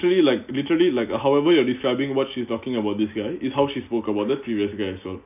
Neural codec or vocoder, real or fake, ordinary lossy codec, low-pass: none; real; none; 3.6 kHz